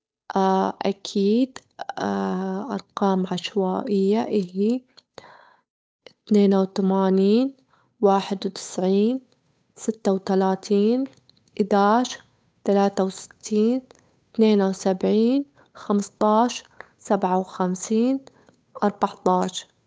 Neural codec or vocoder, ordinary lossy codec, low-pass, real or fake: codec, 16 kHz, 8 kbps, FunCodec, trained on Chinese and English, 25 frames a second; none; none; fake